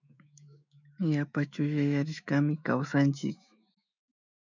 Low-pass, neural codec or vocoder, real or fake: 7.2 kHz; autoencoder, 48 kHz, 128 numbers a frame, DAC-VAE, trained on Japanese speech; fake